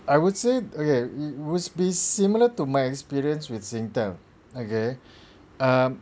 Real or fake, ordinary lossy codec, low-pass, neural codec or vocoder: real; none; none; none